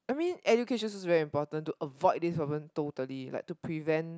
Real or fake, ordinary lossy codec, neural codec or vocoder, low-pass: real; none; none; none